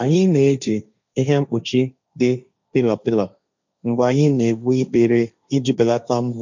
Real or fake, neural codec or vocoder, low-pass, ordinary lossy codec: fake; codec, 16 kHz, 1.1 kbps, Voila-Tokenizer; 7.2 kHz; none